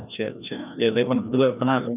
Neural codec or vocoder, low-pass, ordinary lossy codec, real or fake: codec, 16 kHz, 1 kbps, FreqCodec, larger model; 3.6 kHz; none; fake